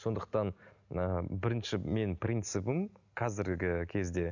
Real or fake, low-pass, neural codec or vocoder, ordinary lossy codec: real; 7.2 kHz; none; none